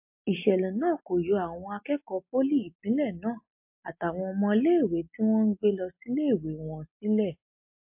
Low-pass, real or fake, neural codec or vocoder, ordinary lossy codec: 3.6 kHz; real; none; MP3, 32 kbps